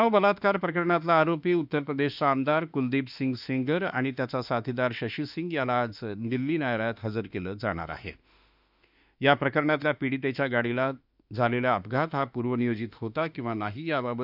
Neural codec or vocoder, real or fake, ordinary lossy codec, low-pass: autoencoder, 48 kHz, 32 numbers a frame, DAC-VAE, trained on Japanese speech; fake; none; 5.4 kHz